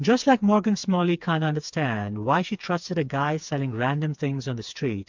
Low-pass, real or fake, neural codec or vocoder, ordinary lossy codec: 7.2 kHz; fake; codec, 16 kHz, 4 kbps, FreqCodec, smaller model; MP3, 64 kbps